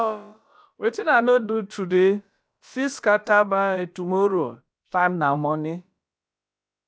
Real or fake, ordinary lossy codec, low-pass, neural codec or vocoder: fake; none; none; codec, 16 kHz, about 1 kbps, DyCAST, with the encoder's durations